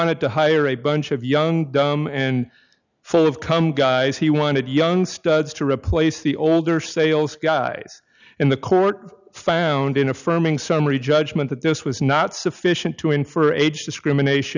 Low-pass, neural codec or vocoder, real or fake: 7.2 kHz; none; real